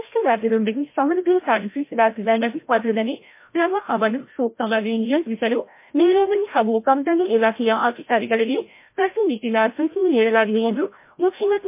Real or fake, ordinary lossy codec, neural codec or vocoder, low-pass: fake; MP3, 24 kbps; codec, 16 kHz, 0.5 kbps, FreqCodec, larger model; 3.6 kHz